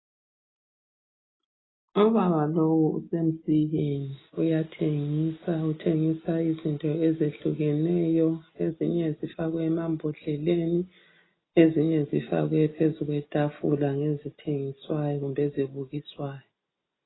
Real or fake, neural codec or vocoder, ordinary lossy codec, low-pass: real; none; AAC, 16 kbps; 7.2 kHz